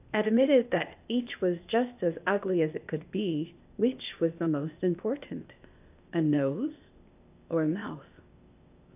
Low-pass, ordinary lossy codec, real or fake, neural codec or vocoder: 3.6 kHz; AAC, 32 kbps; fake; codec, 16 kHz, 0.8 kbps, ZipCodec